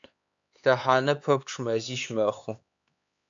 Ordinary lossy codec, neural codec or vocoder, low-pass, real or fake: AAC, 64 kbps; codec, 16 kHz, 4 kbps, X-Codec, HuBERT features, trained on LibriSpeech; 7.2 kHz; fake